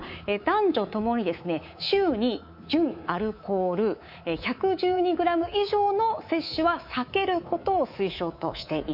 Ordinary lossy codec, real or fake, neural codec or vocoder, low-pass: none; fake; codec, 24 kHz, 3.1 kbps, DualCodec; 5.4 kHz